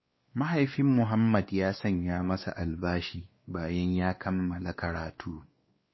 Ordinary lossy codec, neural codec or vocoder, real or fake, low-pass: MP3, 24 kbps; codec, 16 kHz, 2 kbps, X-Codec, WavLM features, trained on Multilingual LibriSpeech; fake; 7.2 kHz